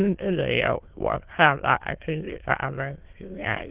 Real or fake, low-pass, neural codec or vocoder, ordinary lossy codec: fake; 3.6 kHz; autoencoder, 22.05 kHz, a latent of 192 numbers a frame, VITS, trained on many speakers; Opus, 16 kbps